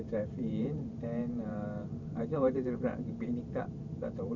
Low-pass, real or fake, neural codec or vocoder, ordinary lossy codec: 7.2 kHz; fake; vocoder, 44.1 kHz, 128 mel bands every 512 samples, BigVGAN v2; none